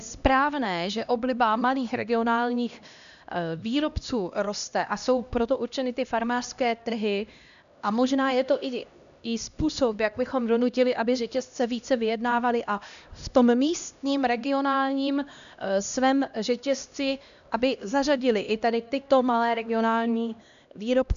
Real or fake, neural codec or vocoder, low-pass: fake; codec, 16 kHz, 1 kbps, X-Codec, HuBERT features, trained on LibriSpeech; 7.2 kHz